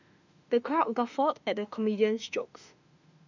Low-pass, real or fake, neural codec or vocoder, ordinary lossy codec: 7.2 kHz; fake; autoencoder, 48 kHz, 32 numbers a frame, DAC-VAE, trained on Japanese speech; none